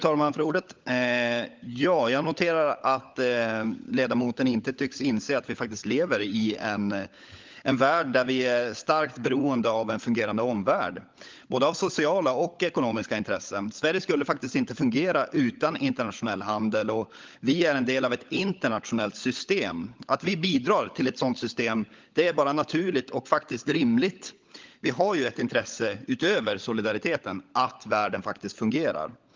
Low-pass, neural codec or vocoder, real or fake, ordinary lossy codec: 7.2 kHz; codec, 16 kHz, 16 kbps, FunCodec, trained on LibriTTS, 50 frames a second; fake; Opus, 32 kbps